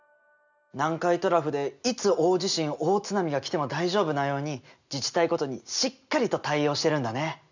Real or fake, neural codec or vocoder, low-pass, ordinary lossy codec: real; none; 7.2 kHz; none